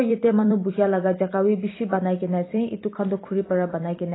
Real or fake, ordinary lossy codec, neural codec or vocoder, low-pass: fake; AAC, 16 kbps; vocoder, 44.1 kHz, 128 mel bands every 256 samples, BigVGAN v2; 7.2 kHz